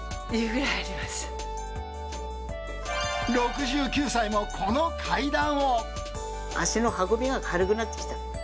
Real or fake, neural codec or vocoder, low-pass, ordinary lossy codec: real; none; none; none